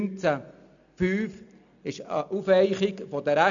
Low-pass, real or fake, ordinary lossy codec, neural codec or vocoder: 7.2 kHz; real; none; none